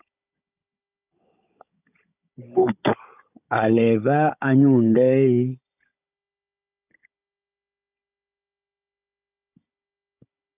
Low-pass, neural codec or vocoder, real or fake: 3.6 kHz; codec, 16 kHz, 16 kbps, FunCodec, trained on Chinese and English, 50 frames a second; fake